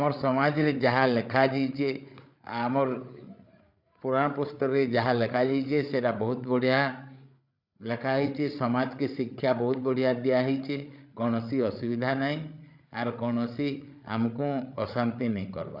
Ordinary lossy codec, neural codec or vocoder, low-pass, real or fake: none; codec, 16 kHz, 8 kbps, FreqCodec, larger model; 5.4 kHz; fake